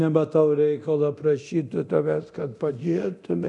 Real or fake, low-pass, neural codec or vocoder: fake; 10.8 kHz; codec, 24 kHz, 0.9 kbps, DualCodec